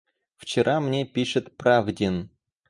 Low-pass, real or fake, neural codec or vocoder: 10.8 kHz; real; none